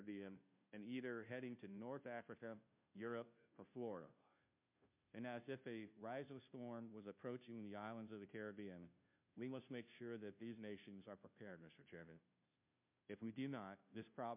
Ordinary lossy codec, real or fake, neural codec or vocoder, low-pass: MP3, 32 kbps; fake; codec, 16 kHz, 0.5 kbps, FunCodec, trained on Chinese and English, 25 frames a second; 3.6 kHz